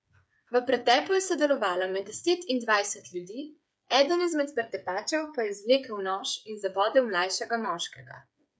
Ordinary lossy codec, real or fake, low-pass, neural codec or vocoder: none; fake; none; codec, 16 kHz, 8 kbps, FreqCodec, smaller model